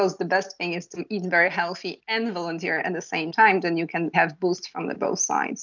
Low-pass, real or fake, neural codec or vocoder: 7.2 kHz; real; none